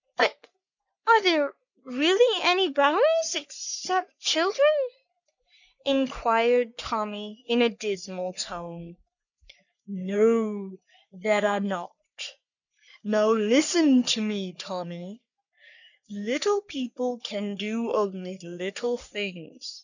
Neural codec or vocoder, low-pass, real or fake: codec, 44.1 kHz, 3.4 kbps, Pupu-Codec; 7.2 kHz; fake